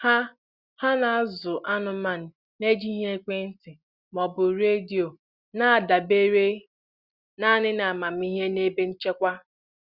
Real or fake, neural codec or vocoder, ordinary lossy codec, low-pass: real; none; Opus, 64 kbps; 5.4 kHz